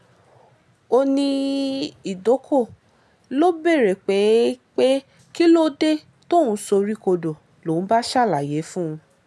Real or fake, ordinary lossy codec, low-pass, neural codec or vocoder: real; none; none; none